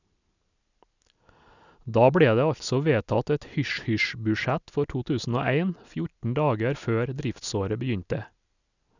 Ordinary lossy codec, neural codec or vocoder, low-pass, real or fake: none; none; 7.2 kHz; real